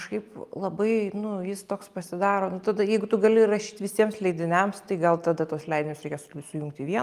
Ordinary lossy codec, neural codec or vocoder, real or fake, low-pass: Opus, 32 kbps; none; real; 14.4 kHz